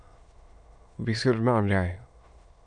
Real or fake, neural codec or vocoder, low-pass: fake; autoencoder, 22.05 kHz, a latent of 192 numbers a frame, VITS, trained on many speakers; 9.9 kHz